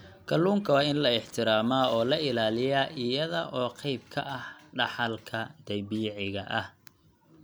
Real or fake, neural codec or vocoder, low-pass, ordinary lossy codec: real; none; none; none